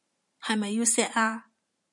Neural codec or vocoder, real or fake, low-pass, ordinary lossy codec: vocoder, 44.1 kHz, 128 mel bands every 512 samples, BigVGAN v2; fake; 10.8 kHz; MP3, 96 kbps